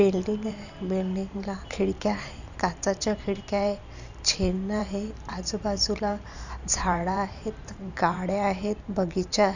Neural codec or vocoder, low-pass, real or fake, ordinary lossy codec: vocoder, 44.1 kHz, 128 mel bands every 512 samples, BigVGAN v2; 7.2 kHz; fake; none